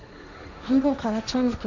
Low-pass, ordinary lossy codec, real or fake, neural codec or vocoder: 7.2 kHz; none; fake; codec, 16 kHz, 1.1 kbps, Voila-Tokenizer